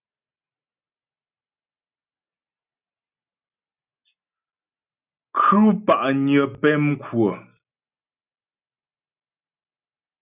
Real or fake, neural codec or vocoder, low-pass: real; none; 3.6 kHz